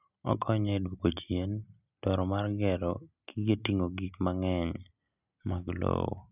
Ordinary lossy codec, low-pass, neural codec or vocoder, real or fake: none; 3.6 kHz; none; real